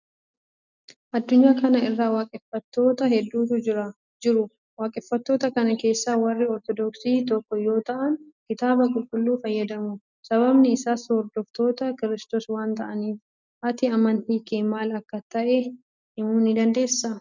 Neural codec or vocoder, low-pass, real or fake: none; 7.2 kHz; real